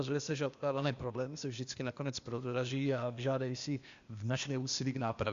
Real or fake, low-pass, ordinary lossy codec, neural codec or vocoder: fake; 7.2 kHz; Opus, 64 kbps; codec, 16 kHz, 0.8 kbps, ZipCodec